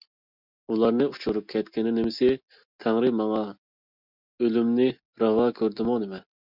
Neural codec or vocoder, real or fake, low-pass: none; real; 5.4 kHz